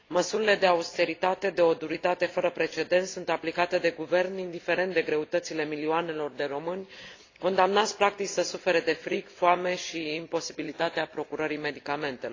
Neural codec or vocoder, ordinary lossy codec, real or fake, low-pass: none; AAC, 32 kbps; real; 7.2 kHz